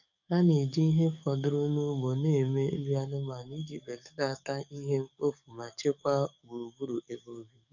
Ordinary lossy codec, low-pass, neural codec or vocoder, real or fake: none; 7.2 kHz; codec, 44.1 kHz, 7.8 kbps, DAC; fake